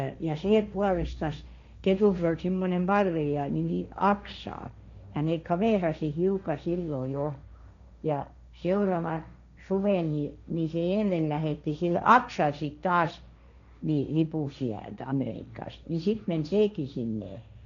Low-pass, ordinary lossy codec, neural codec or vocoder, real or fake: 7.2 kHz; none; codec, 16 kHz, 1.1 kbps, Voila-Tokenizer; fake